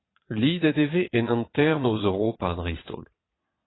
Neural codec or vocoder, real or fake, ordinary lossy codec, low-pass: vocoder, 22.05 kHz, 80 mel bands, Vocos; fake; AAC, 16 kbps; 7.2 kHz